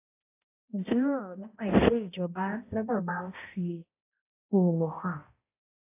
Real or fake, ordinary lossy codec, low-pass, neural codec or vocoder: fake; AAC, 24 kbps; 3.6 kHz; codec, 16 kHz, 0.5 kbps, X-Codec, HuBERT features, trained on balanced general audio